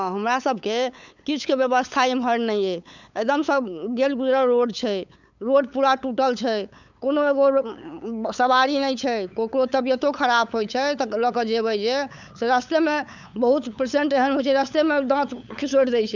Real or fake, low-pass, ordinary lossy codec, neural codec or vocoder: fake; 7.2 kHz; none; codec, 16 kHz, 8 kbps, FunCodec, trained on LibriTTS, 25 frames a second